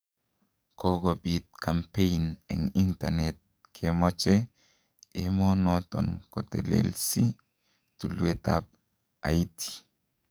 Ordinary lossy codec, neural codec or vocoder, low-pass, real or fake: none; codec, 44.1 kHz, 7.8 kbps, DAC; none; fake